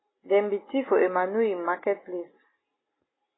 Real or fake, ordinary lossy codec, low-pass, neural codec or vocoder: real; AAC, 16 kbps; 7.2 kHz; none